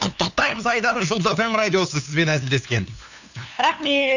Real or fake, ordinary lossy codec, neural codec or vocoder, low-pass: fake; none; codec, 16 kHz, 2 kbps, FunCodec, trained on LibriTTS, 25 frames a second; 7.2 kHz